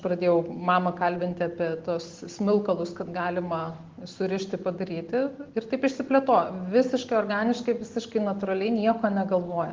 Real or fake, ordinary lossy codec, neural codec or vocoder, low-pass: real; Opus, 16 kbps; none; 7.2 kHz